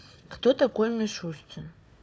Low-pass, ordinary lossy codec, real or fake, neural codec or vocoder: none; none; fake; codec, 16 kHz, 4 kbps, FunCodec, trained on Chinese and English, 50 frames a second